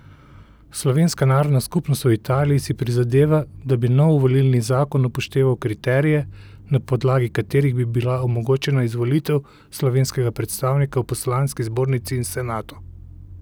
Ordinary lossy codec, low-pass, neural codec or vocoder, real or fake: none; none; none; real